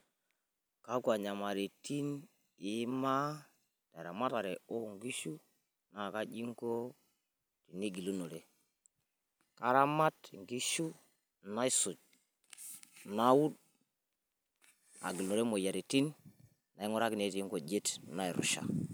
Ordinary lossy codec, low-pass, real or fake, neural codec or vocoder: none; none; real; none